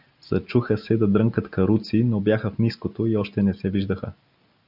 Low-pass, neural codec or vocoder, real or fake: 5.4 kHz; none; real